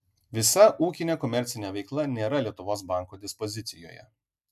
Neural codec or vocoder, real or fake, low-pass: none; real; 14.4 kHz